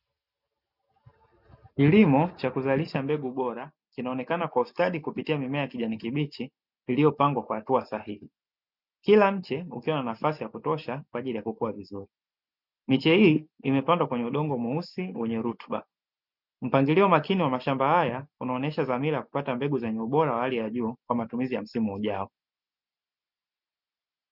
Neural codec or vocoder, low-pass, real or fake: none; 5.4 kHz; real